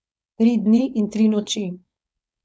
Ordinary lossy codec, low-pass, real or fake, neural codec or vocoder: none; none; fake; codec, 16 kHz, 4.8 kbps, FACodec